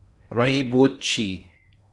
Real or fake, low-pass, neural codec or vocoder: fake; 10.8 kHz; codec, 16 kHz in and 24 kHz out, 0.8 kbps, FocalCodec, streaming, 65536 codes